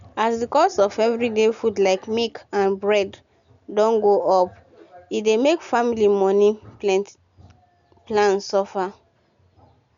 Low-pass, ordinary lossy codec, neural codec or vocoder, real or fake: 7.2 kHz; none; none; real